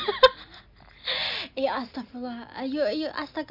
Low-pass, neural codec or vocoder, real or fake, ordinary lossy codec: 5.4 kHz; none; real; none